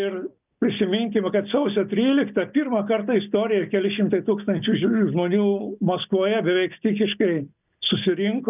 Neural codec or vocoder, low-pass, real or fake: none; 3.6 kHz; real